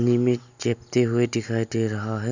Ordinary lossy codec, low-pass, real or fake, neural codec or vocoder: none; 7.2 kHz; real; none